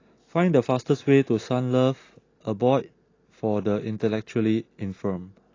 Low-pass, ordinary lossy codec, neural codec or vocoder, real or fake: 7.2 kHz; AAC, 32 kbps; none; real